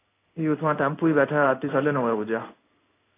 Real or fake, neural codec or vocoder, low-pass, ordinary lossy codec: fake; codec, 16 kHz in and 24 kHz out, 1 kbps, XY-Tokenizer; 3.6 kHz; AAC, 24 kbps